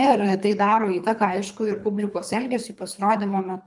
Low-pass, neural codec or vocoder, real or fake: 10.8 kHz; codec, 24 kHz, 3 kbps, HILCodec; fake